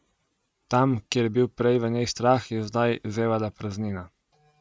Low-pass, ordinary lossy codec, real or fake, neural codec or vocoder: none; none; real; none